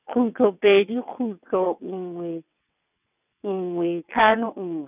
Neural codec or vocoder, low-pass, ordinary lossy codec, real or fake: vocoder, 22.05 kHz, 80 mel bands, WaveNeXt; 3.6 kHz; none; fake